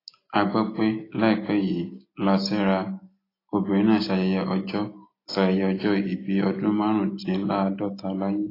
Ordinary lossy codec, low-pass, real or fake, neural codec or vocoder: AAC, 24 kbps; 5.4 kHz; real; none